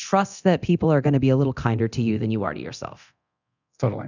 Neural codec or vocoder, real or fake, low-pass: codec, 24 kHz, 0.9 kbps, DualCodec; fake; 7.2 kHz